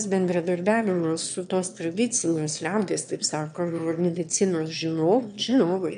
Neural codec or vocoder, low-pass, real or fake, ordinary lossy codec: autoencoder, 22.05 kHz, a latent of 192 numbers a frame, VITS, trained on one speaker; 9.9 kHz; fake; MP3, 64 kbps